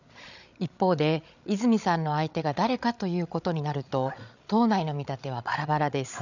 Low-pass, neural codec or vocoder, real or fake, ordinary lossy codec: 7.2 kHz; codec, 16 kHz, 8 kbps, FreqCodec, larger model; fake; none